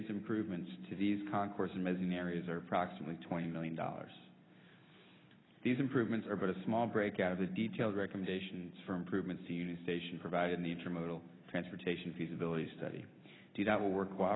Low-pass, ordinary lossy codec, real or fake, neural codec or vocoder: 7.2 kHz; AAC, 16 kbps; real; none